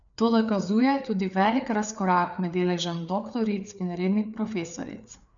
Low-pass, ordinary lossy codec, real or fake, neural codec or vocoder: 7.2 kHz; none; fake; codec, 16 kHz, 4 kbps, FreqCodec, larger model